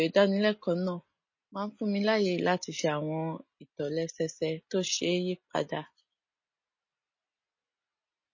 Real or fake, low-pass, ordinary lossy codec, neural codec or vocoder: real; 7.2 kHz; MP3, 32 kbps; none